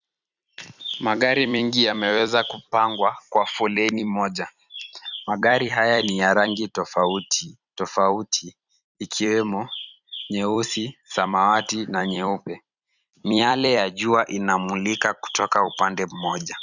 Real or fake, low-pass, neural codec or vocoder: fake; 7.2 kHz; vocoder, 44.1 kHz, 128 mel bands every 256 samples, BigVGAN v2